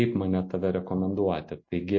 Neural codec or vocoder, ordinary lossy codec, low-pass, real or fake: none; MP3, 32 kbps; 7.2 kHz; real